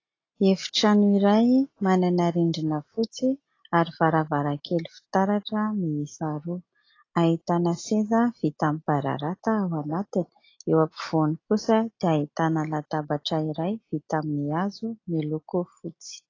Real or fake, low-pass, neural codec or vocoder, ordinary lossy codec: real; 7.2 kHz; none; AAC, 32 kbps